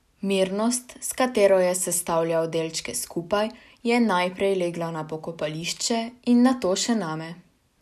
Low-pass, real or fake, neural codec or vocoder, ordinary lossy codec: 14.4 kHz; real; none; none